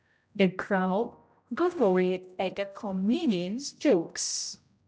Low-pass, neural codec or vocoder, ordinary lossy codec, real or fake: none; codec, 16 kHz, 0.5 kbps, X-Codec, HuBERT features, trained on general audio; none; fake